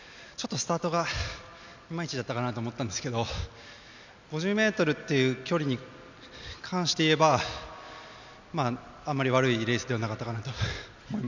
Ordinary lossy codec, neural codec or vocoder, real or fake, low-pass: none; none; real; 7.2 kHz